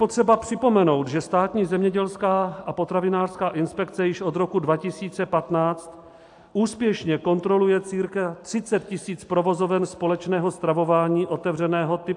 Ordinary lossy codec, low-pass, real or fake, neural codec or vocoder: AAC, 64 kbps; 10.8 kHz; real; none